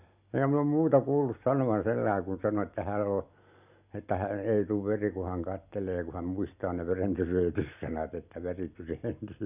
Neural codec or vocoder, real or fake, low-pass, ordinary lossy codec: none; real; 3.6 kHz; none